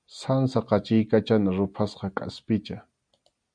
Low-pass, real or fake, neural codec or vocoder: 9.9 kHz; real; none